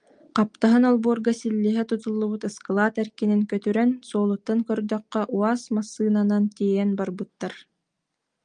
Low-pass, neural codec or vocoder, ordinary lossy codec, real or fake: 9.9 kHz; none; Opus, 32 kbps; real